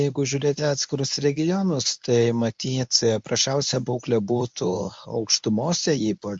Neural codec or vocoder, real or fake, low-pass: codec, 24 kHz, 0.9 kbps, WavTokenizer, medium speech release version 1; fake; 10.8 kHz